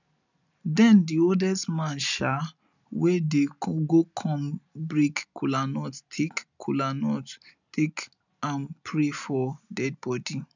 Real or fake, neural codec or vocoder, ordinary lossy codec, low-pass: real; none; none; 7.2 kHz